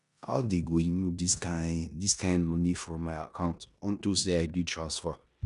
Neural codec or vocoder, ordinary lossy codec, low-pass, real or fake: codec, 16 kHz in and 24 kHz out, 0.9 kbps, LongCat-Audio-Codec, four codebook decoder; none; 10.8 kHz; fake